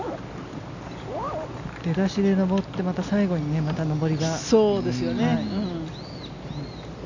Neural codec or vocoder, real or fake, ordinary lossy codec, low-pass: none; real; none; 7.2 kHz